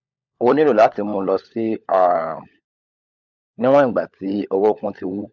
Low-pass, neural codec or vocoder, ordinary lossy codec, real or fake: 7.2 kHz; codec, 16 kHz, 16 kbps, FunCodec, trained on LibriTTS, 50 frames a second; none; fake